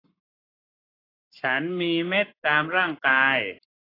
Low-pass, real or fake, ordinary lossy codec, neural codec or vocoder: 5.4 kHz; fake; AAC, 24 kbps; codec, 44.1 kHz, 7.8 kbps, Pupu-Codec